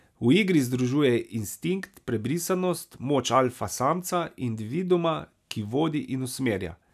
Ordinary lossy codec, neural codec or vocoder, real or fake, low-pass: none; none; real; 14.4 kHz